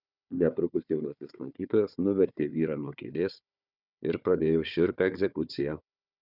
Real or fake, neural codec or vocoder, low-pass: fake; codec, 16 kHz, 4 kbps, FunCodec, trained on Chinese and English, 50 frames a second; 5.4 kHz